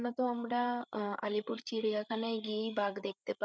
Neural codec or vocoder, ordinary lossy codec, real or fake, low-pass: codec, 16 kHz, 8 kbps, FreqCodec, larger model; none; fake; none